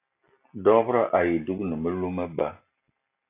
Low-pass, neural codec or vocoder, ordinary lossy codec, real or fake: 3.6 kHz; none; AAC, 24 kbps; real